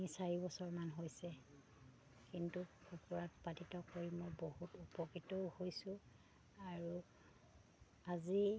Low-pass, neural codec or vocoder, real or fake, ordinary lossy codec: none; none; real; none